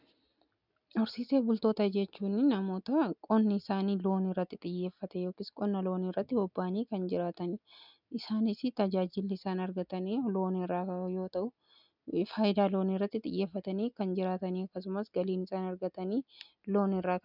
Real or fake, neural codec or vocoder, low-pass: real; none; 5.4 kHz